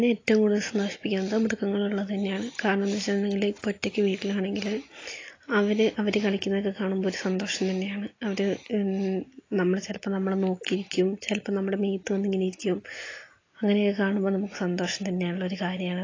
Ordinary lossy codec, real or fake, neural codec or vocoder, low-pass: AAC, 32 kbps; real; none; 7.2 kHz